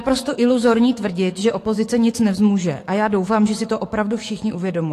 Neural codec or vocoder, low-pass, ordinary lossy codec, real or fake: codec, 44.1 kHz, 7.8 kbps, DAC; 14.4 kHz; AAC, 48 kbps; fake